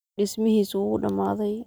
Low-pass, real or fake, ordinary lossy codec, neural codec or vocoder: none; real; none; none